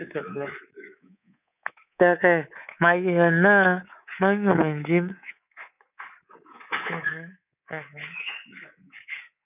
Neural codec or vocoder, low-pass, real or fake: codec, 24 kHz, 3.1 kbps, DualCodec; 3.6 kHz; fake